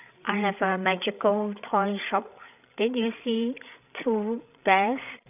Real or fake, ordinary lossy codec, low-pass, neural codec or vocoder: fake; none; 3.6 kHz; codec, 16 kHz, 16 kbps, FreqCodec, larger model